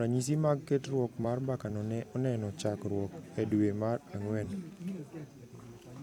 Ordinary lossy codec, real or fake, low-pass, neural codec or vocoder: none; fake; 19.8 kHz; vocoder, 44.1 kHz, 128 mel bands every 256 samples, BigVGAN v2